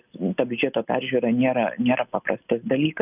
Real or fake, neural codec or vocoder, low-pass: real; none; 3.6 kHz